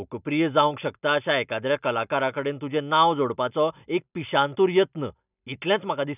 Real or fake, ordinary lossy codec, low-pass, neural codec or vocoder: real; none; 3.6 kHz; none